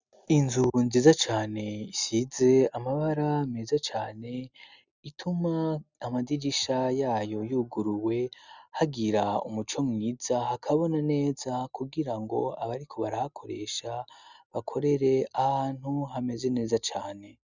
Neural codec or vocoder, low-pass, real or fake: none; 7.2 kHz; real